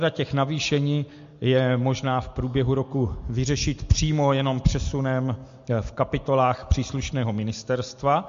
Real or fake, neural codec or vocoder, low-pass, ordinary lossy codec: real; none; 7.2 kHz; MP3, 48 kbps